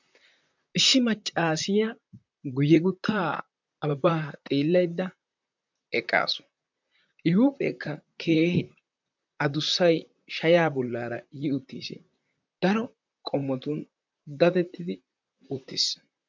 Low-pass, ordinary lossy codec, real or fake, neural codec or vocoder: 7.2 kHz; MP3, 64 kbps; fake; vocoder, 44.1 kHz, 128 mel bands, Pupu-Vocoder